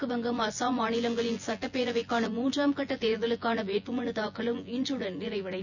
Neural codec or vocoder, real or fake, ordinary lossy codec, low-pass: vocoder, 24 kHz, 100 mel bands, Vocos; fake; none; 7.2 kHz